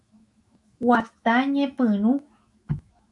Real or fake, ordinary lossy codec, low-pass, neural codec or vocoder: fake; MP3, 64 kbps; 10.8 kHz; autoencoder, 48 kHz, 128 numbers a frame, DAC-VAE, trained on Japanese speech